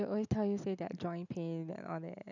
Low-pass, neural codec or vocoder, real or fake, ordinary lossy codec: 7.2 kHz; none; real; none